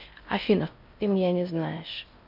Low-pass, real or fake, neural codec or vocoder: 5.4 kHz; fake; codec, 16 kHz in and 24 kHz out, 0.6 kbps, FocalCodec, streaming, 4096 codes